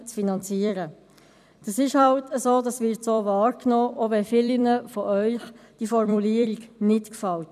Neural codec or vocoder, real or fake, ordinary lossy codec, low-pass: vocoder, 44.1 kHz, 128 mel bands every 256 samples, BigVGAN v2; fake; none; 14.4 kHz